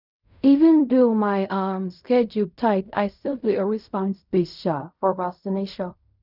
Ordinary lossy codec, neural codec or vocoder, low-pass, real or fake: none; codec, 16 kHz in and 24 kHz out, 0.4 kbps, LongCat-Audio-Codec, fine tuned four codebook decoder; 5.4 kHz; fake